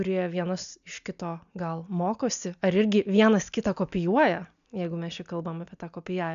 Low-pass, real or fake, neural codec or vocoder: 7.2 kHz; real; none